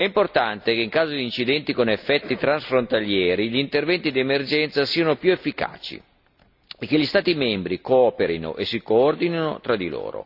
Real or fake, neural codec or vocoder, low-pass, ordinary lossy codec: real; none; 5.4 kHz; none